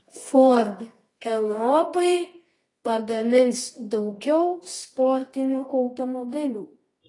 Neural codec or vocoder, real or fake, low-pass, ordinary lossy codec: codec, 24 kHz, 0.9 kbps, WavTokenizer, medium music audio release; fake; 10.8 kHz; AAC, 32 kbps